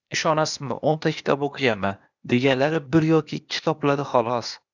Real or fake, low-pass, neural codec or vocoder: fake; 7.2 kHz; codec, 16 kHz, 0.8 kbps, ZipCodec